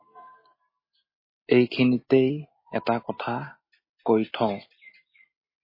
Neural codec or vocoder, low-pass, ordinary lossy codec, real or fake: none; 5.4 kHz; MP3, 24 kbps; real